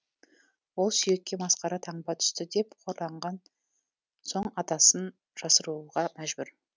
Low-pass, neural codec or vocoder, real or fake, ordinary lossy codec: none; none; real; none